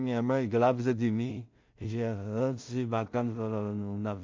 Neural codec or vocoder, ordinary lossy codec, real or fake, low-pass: codec, 16 kHz in and 24 kHz out, 0.4 kbps, LongCat-Audio-Codec, two codebook decoder; MP3, 48 kbps; fake; 7.2 kHz